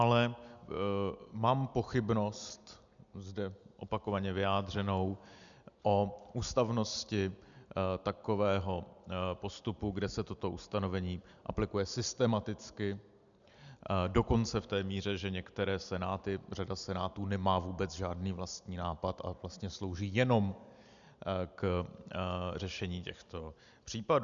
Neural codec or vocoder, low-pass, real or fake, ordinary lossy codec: none; 7.2 kHz; real; MP3, 96 kbps